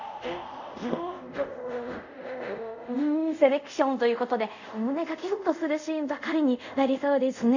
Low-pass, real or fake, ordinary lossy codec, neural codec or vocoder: 7.2 kHz; fake; none; codec, 24 kHz, 0.5 kbps, DualCodec